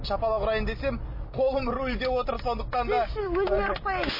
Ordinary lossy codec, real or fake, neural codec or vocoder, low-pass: MP3, 24 kbps; real; none; 5.4 kHz